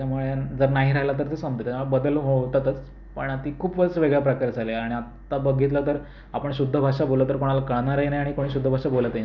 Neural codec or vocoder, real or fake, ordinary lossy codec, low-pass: none; real; none; 7.2 kHz